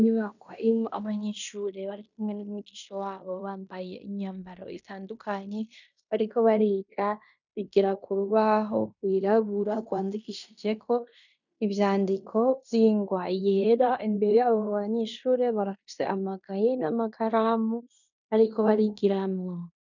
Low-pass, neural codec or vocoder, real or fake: 7.2 kHz; codec, 16 kHz in and 24 kHz out, 0.9 kbps, LongCat-Audio-Codec, fine tuned four codebook decoder; fake